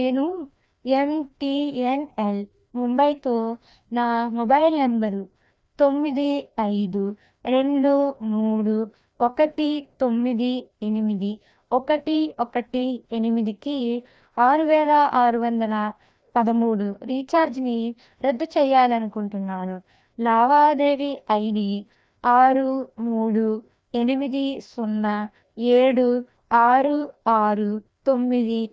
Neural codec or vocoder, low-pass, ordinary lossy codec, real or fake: codec, 16 kHz, 1 kbps, FreqCodec, larger model; none; none; fake